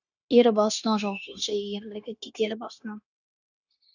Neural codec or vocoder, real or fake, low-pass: codec, 16 kHz, 0.9 kbps, LongCat-Audio-Codec; fake; 7.2 kHz